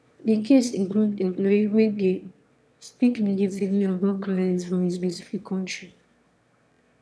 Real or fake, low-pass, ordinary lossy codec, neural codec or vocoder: fake; none; none; autoencoder, 22.05 kHz, a latent of 192 numbers a frame, VITS, trained on one speaker